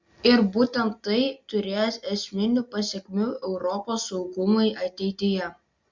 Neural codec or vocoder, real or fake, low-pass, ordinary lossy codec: none; real; 7.2 kHz; Opus, 64 kbps